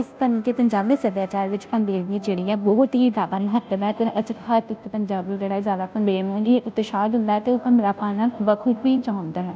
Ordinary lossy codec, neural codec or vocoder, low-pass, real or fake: none; codec, 16 kHz, 0.5 kbps, FunCodec, trained on Chinese and English, 25 frames a second; none; fake